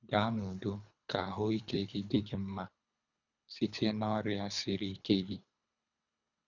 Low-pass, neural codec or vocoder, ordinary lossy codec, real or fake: 7.2 kHz; codec, 24 kHz, 3 kbps, HILCodec; none; fake